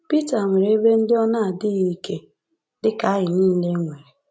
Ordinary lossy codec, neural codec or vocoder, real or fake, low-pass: none; none; real; none